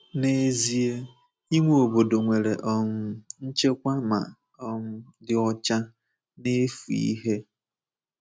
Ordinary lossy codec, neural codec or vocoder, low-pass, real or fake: none; none; none; real